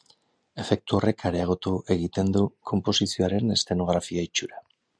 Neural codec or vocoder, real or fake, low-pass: none; real; 9.9 kHz